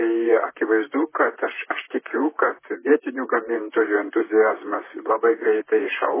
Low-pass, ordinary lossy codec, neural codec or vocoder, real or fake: 3.6 kHz; MP3, 16 kbps; vocoder, 44.1 kHz, 128 mel bands, Pupu-Vocoder; fake